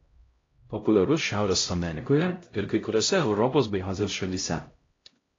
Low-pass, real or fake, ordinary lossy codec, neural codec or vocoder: 7.2 kHz; fake; AAC, 32 kbps; codec, 16 kHz, 0.5 kbps, X-Codec, HuBERT features, trained on LibriSpeech